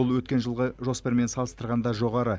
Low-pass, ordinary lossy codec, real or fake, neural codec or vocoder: none; none; real; none